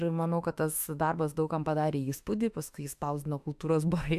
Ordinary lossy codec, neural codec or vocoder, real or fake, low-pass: AAC, 96 kbps; autoencoder, 48 kHz, 32 numbers a frame, DAC-VAE, trained on Japanese speech; fake; 14.4 kHz